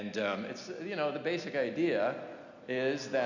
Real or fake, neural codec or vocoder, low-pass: real; none; 7.2 kHz